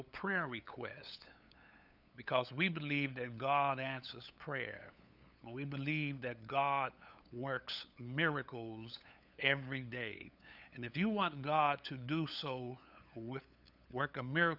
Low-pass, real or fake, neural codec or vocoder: 5.4 kHz; fake; codec, 16 kHz, 8 kbps, FunCodec, trained on LibriTTS, 25 frames a second